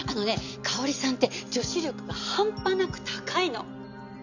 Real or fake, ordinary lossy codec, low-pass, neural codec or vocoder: real; none; 7.2 kHz; none